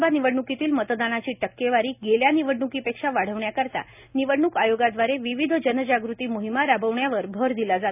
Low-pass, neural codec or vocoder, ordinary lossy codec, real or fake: 3.6 kHz; none; none; real